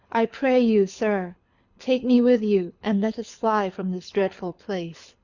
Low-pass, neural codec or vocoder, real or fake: 7.2 kHz; codec, 24 kHz, 6 kbps, HILCodec; fake